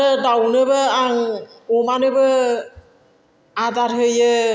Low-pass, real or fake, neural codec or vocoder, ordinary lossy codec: none; real; none; none